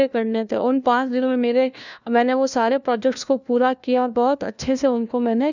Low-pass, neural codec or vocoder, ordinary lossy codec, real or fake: 7.2 kHz; codec, 16 kHz, 1 kbps, FunCodec, trained on LibriTTS, 50 frames a second; none; fake